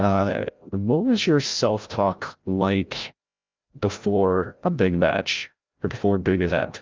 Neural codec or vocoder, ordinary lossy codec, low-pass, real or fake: codec, 16 kHz, 0.5 kbps, FreqCodec, larger model; Opus, 24 kbps; 7.2 kHz; fake